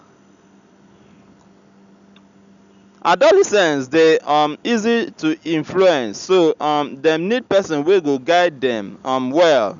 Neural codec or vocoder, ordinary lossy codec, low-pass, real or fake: none; MP3, 96 kbps; 7.2 kHz; real